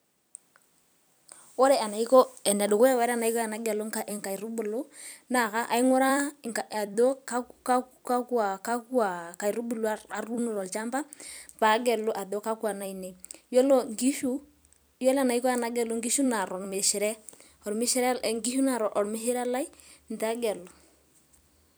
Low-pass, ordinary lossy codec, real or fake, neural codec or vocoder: none; none; fake; vocoder, 44.1 kHz, 128 mel bands every 256 samples, BigVGAN v2